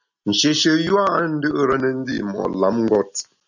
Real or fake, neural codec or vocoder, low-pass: real; none; 7.2 kHz